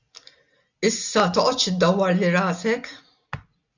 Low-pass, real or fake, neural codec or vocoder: 7.2 kHz; real; none